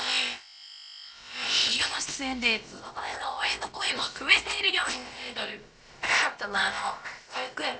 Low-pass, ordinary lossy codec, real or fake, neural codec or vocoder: none; none; fake; codec, 16 kHz, about 1 kbps, DyCAST, with the encoder's durations